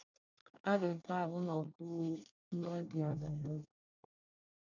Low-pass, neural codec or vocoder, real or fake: 7.2 kHz; codec, 24 kHz, 1 kbps, SNAC; fake